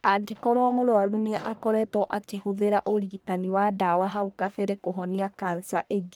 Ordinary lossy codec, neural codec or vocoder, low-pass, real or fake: none; codec, 44.1 kHz, 1.7 kbps, Pupu-Codec; none; fake